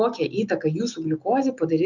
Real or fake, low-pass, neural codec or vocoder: real; 7.2 kHz; none